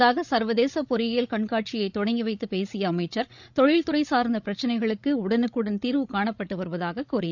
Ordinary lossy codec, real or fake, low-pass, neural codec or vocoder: none; fake; 7.2 kHz; codec, 16 kHz, 16 kbps, FreqCodec, larger model